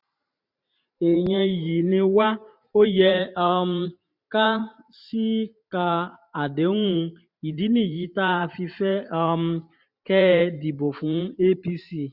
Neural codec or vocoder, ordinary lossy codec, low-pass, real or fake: vocoder, 44.1 kHz, 128 mel bands every 512 samples, BigVGAN v2; none; 5.4 kHz; fake